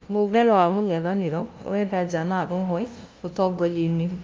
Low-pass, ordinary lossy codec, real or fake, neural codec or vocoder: 7.2 kHz; Opus, 24 kbps; fake; codec, 16 kHz, 0.5 kbps, FunCodec, trained on LibriTTS, 25 frames a second